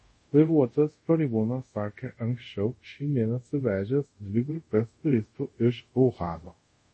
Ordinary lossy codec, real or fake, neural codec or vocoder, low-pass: MP3, 32 kbps; fake; codec, 24 kHz, 0.5 kbps, DualCodec; 10.8 kHz